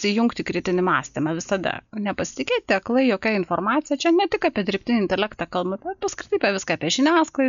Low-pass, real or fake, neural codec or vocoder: 7.2 kHz; real; none